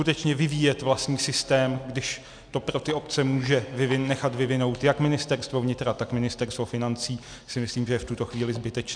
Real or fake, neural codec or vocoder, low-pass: real; none; 9.9 kHz